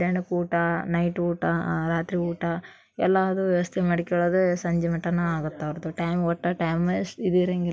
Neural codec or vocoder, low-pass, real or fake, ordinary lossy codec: none; none; real; none